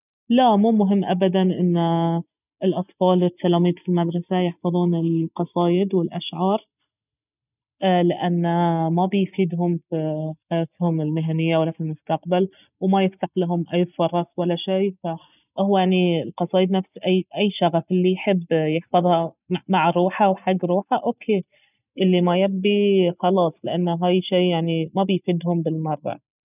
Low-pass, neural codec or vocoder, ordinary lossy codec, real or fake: 3.6 kHz; none; none; real